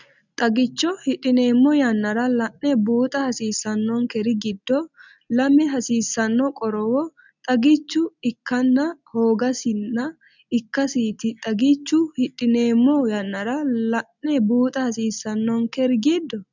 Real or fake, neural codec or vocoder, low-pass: real; none; 7.2 kHz